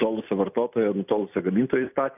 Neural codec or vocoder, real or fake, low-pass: none; real; 3.6 kHz